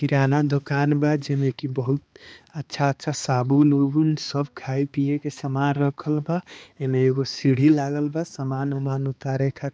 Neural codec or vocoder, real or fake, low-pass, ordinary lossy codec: codec, 16 kHz, 2 kbps, X-Codec, HuBERT features, trained on balanced general audio; fake; none; none